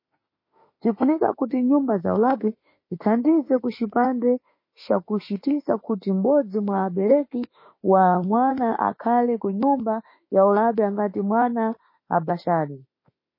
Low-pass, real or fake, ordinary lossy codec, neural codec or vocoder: 5.4 kHz; fake; MP3, 24 kbps; autoencoder, 48 kHz, 32 numbers a frame, DAC-VAE, trained on Japanese speech